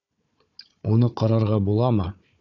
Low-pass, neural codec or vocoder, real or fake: 7.2 kHz; codec, 16 kHz, 4 kbps, FunCodec, trained on Chinese and English, 50 frames a second; fake